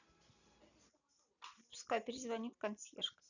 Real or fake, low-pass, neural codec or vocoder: real; 7.2 kHz; none